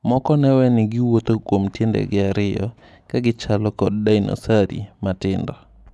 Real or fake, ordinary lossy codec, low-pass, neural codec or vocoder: real; none; none; none